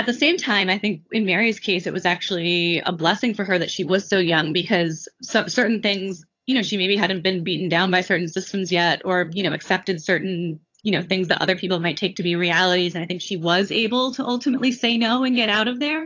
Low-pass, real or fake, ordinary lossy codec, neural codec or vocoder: 7.2 kHz; fake; AAC, 48 kbps; vocoder, 22.05 kHz, 80 mel bands, HiFi-GAN